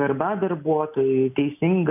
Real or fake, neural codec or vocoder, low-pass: real; none; 3.6 kHz